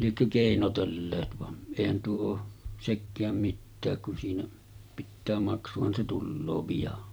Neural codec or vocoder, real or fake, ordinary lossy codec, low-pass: vocoder, 44.1 kHz, 128 mel bands every 256 samples, BigVGAN v2; fake; none; none